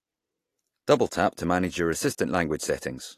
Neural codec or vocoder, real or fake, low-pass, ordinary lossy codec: none; real; 14.4 kHz; AAC, 48 kbps